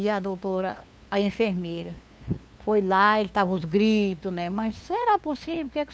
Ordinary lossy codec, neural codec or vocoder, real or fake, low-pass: none; codec, 16 kHz, 2 kbps, FunCodec, trained on LibriTTS, 25 frames a second; fake; none